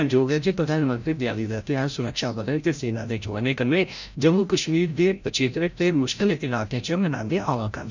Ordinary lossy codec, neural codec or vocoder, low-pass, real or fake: none; codec, 16 kHz, 0.5 kbps, FreqCodec, larger model; 7.2 kHz; fake